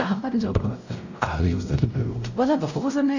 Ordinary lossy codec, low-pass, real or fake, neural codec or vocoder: none; 7.2 kHz; fake; codec, 16 kHz, 0.5 kbps, X-Codec, WavLM features, trained on Multilingual LibriSpeech